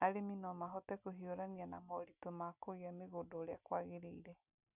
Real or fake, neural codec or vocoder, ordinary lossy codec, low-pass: real; none; none; 3.6 kHz